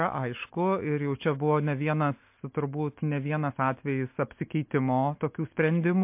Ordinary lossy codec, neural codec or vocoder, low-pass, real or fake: MP3, 32 kbps; none; 3.6 kHz; real